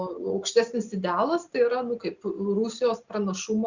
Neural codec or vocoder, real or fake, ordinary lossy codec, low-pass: none; real; Opus, 64 kbps; 7.2 kHz